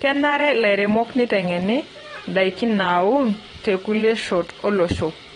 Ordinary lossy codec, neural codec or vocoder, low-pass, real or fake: AAC, 32 kbps; vocoder, 22.05 kHz, 80 mel bands, WaveNeXt; 9.9 kHz; fake